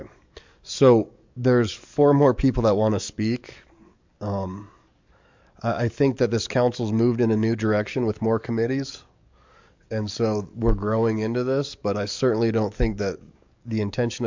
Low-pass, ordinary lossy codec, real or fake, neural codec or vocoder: 7.2 kHz; MP3, 64 kbps; fake; codec, 44.1 kHz, 7.8 kbps, DAC